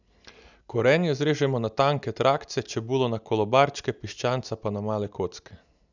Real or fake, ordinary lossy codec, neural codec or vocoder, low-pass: real; none; none; 7.2 kHz